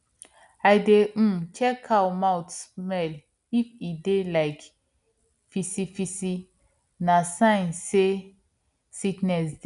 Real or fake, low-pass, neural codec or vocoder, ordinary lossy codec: real; 10.8 kHz; none; none